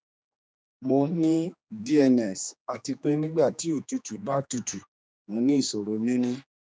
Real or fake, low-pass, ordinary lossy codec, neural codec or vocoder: fake; none; none; codec, 16 kHz, 2 kbps, X-Codec, HuBERT features, trained on general audio